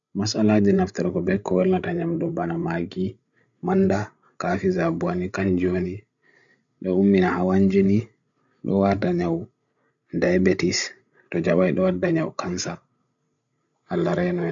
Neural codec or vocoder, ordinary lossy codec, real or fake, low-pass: codec, 16 kHz, 16 kbps, FreqCodec, larger model; none; fake; 7.2 kHz